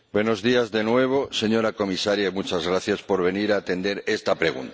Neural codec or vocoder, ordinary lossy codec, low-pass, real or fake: none; none; none; real